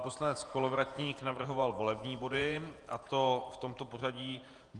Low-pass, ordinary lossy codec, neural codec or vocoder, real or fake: 10.8 kHz; Opus, 32 kbps; vocoder, 48 kHz, 128 mel bands, Vocos; fake